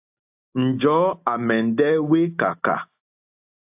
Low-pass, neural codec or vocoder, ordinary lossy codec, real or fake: 3.6 kHz; none; AAC, 32 kbps; real